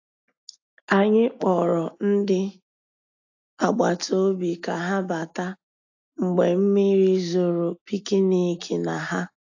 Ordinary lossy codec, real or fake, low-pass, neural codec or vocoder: AAC, 48 kbps; real; 7.2 kHz; none